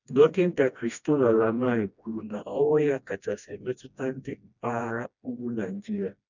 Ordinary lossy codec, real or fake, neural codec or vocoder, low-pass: none; fake; codec, 16 kHz, 1 kbps, FreqCodec, smaller model; 7.2 kHz